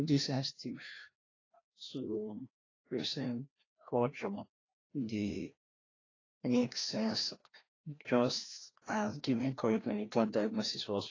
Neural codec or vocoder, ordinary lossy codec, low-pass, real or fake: codec, 16 kHz, 1 kbps, FreqCodec, larger model; AAC, 32 kbps; 7.2 kHz; fake